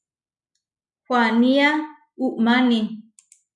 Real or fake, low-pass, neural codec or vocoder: real; 10.8 kHz; none